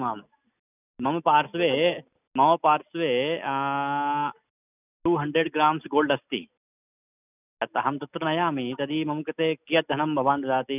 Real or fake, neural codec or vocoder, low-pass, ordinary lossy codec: real; none; 3.6 kHz; none